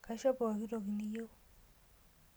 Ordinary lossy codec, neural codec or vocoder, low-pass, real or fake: none; none; none; real